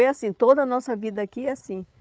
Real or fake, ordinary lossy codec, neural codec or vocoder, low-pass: fake; none; codec, 16 kHz, 8 kbps, FreqCodec, larger model; none